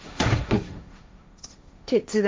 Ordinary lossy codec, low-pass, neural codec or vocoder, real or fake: none; none; codec, 16 kHz, 1.1 kbps, Voila-Tokenizer; fake